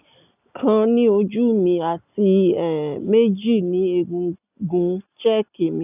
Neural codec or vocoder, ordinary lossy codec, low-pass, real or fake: none; none; 3.6 kHz; real